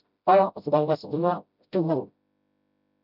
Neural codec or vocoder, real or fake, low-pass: codec, 16 kHz, 0.5 kbps, FreqCodec, smaller model; fake; 5.4 kHz